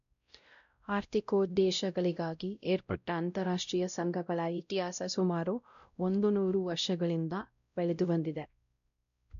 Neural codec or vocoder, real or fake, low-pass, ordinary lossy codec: codec, 16 kHz, 0.5 kbps, X-Codec, WavLM features, trained on Multilingual LibriSpeech; fake; 7.2 kHz; none